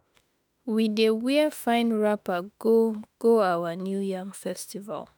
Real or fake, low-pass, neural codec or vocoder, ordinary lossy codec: fake; none; autoencoder, 48 kHz, 32 numbers a frame, DAC-VAE, trained on Japanese speech; none